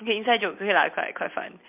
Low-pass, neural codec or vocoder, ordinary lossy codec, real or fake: 3.6 kHz; none; MP3, 32 kbps; real